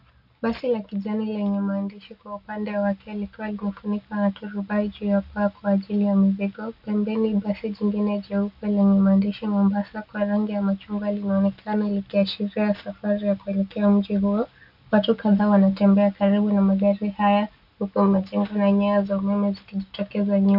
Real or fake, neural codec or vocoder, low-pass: real; none; 5.4 kHz